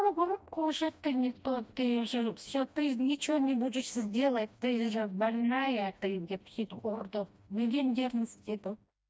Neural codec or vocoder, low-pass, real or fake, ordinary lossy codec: codec, 16 kHz, 1 kbps, FreqCodec, smaller model; none; fake; none